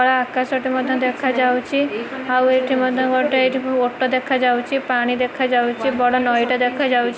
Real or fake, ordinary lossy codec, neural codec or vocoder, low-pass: real; none; none; none